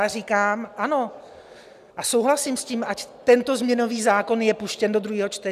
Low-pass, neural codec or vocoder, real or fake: 14.4 kHz; none; real